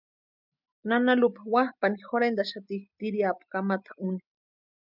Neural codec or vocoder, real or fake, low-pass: none; real; 5.4 kHz